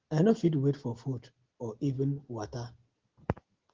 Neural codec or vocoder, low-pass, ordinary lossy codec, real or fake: none; 7.2 kHz; Opus, 16 kbps; real